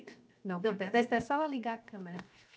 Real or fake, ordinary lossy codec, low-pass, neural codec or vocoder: fake; none; none; codec, 16 kHz, 0.7 kbps, FocalCodec